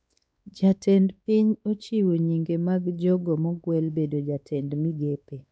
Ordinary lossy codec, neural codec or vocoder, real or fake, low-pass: none; codec, 16 kHz, 4 kbps, X-Codec, WavLM features, trained on Multilingual LibriSpeech; fake; none